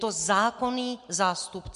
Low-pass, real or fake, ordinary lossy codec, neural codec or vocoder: 10.8 kHz; real; MP3, 64 kbps; none